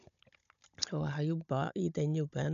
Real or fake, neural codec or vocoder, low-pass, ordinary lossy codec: fake; codec, 16 kHz, 16 kbps, FunCodec, trained on Chinese and English, 50 frames a second; 7.2 kHz; none